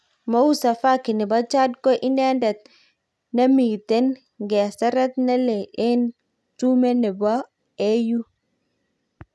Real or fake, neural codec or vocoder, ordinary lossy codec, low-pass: real; none; none; none